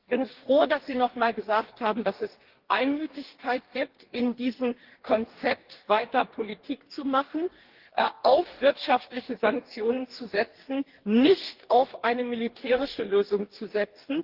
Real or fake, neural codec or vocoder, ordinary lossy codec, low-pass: fake; codec, 44.1 kHz, 2.6 kbps, DAC; Opus, 16 kbps; 5.4 kHz